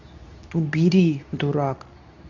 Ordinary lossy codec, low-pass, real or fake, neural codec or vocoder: none; 7.2 kHz; fake; codec, 24 kHz, 0.9 kbps, WavTokenizer, medium speech release version 2